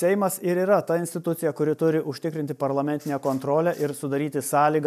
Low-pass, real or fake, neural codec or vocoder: 14.4 kHz; real; none